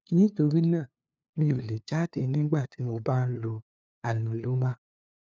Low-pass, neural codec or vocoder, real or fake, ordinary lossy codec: none; codec, 16 kHz, 2 kbps, FunCodec, trained on LibriTTS, 25 frames a second; fake; none